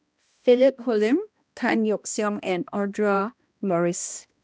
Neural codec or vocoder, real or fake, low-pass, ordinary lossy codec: codec, 16 kHz, 1 kbps, X-Codec, HuBERT features, trained on balanced general audio; fake; none; none